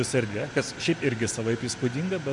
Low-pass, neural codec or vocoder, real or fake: 10.8 kHz; none; real